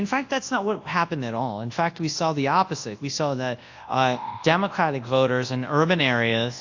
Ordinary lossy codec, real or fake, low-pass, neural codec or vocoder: AAC, 48 kbps; fake; 7.2 kHz; codec, 24 kHz, 0.9 kbps, WavTokenizer, large speech release